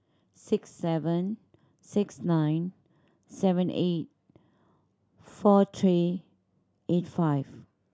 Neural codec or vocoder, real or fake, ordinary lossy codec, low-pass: none; real; none; none